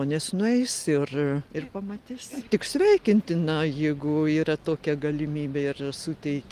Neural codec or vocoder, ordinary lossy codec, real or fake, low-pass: none; Opus, 24 kbps; real; 14.4 kHz